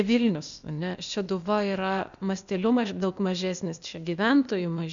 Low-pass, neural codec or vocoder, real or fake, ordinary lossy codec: 7.2 kHz; codec, 16 kHz, 0.8 kbps, ZipCodec; fake; MP3, 96 kbps